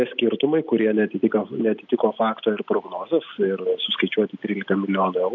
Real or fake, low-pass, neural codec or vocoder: real; 7.2 kHz; none